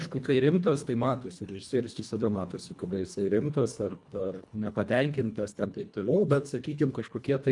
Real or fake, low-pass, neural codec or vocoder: fake; 10.8 kHz; codec, 24 kHz, 1.5 kbps, HILCodec